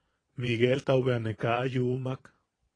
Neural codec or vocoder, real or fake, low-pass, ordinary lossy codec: vocoder, 22.05 kHz, 80 mel bands, Vocos; fake; 9.9 kHz; AAC, 32 kbps